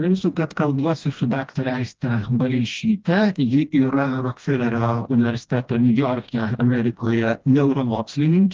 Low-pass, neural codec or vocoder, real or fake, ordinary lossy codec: 7.2 kHz; codec, 16 kHz, 1 kbps, FreqCodec, smaller model; fake; Opus, 24 kbps